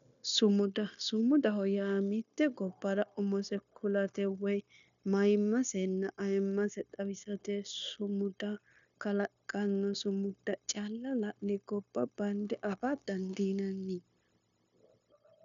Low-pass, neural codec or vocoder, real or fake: 7.2 kHz; codec, 16 kHz, 0.9 kbps, LongCat-Audio-Codec; fake